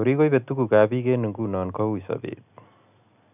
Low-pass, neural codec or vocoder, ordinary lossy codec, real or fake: 3.6 kHz; none; none; real